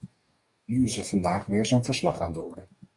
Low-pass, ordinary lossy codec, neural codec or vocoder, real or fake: 10.8 kHz; Opus, 64 kbps; codec, 44.1 kHz, 2.6 kbps, DAC; fake